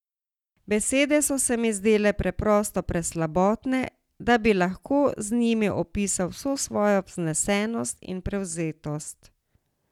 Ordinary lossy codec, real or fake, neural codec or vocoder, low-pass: none; real; none; 19.8 kHz